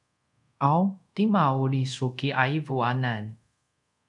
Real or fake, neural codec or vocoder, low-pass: fake; codec, 24 kHz, 0.5 kbps, DualCodec; 10.8 kHz